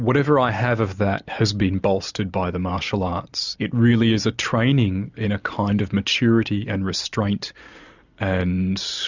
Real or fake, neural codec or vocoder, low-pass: real; none; 7.2 kHz